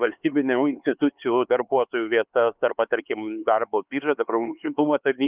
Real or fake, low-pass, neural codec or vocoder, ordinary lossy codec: fake; 3.6 kHz; codec, 16 kHz, 4 kbps, X-Codec, HuBERT features, trained on LibriSpeech; Opus, 24 kbps